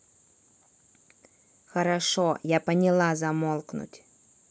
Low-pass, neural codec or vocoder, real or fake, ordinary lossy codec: none; none; real; none